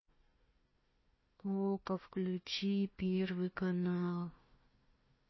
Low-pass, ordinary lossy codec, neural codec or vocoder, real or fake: 7.2 kHz; MP3, 24 kbps; codec, 16 kHz, 1 kbps, FunCodec, trained on Chinese and English, 50 frames a second; fake